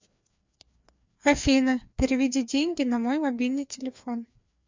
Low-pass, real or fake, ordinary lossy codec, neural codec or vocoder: 7.2 kHz; fake; MP3, 64 kbps; codec, 16 kHz, 2 kbps, FreqCodec, larger model